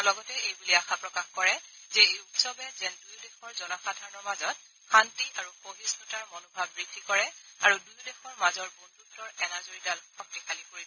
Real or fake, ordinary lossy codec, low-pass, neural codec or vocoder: real; none; 7.2 kHz; none